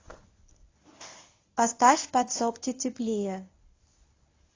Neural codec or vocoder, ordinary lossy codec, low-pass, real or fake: codec, 24 kHz, 0.9 kbps, WavTokenizer, medium speech release version 1; AAC, 32 kbps; 7.2 kHz; fake